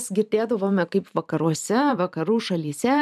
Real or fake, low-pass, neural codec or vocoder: fake; 14.4 kHz; vocoder, 44.1 kHz, 128 mel bands every 512 samples, BigVGAN v2